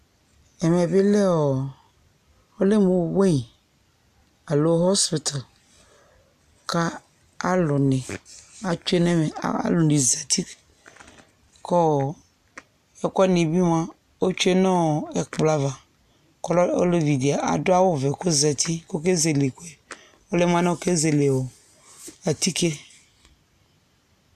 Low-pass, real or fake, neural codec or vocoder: 14.4 kHz; real; none